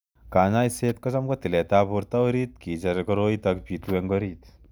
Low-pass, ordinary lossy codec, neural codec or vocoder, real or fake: none; none; none; real